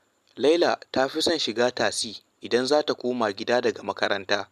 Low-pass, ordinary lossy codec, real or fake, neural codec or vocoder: 14.4 kHz; none; real; none